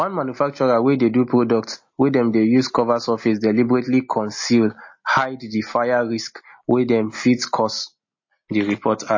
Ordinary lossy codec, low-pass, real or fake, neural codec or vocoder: MP3, 32 kbps; 7.2 kHz; real; none